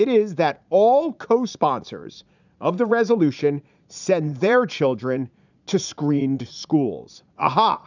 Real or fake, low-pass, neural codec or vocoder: fake; 7.2 kHz; vocoder, 44.1 kHz, 80 mel bands, Vocos